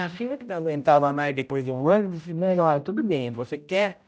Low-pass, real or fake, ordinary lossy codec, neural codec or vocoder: none; fake; none; codec, 16 kHz, 0.5 kbps, X-Codec, HuBERT features, trained on general audio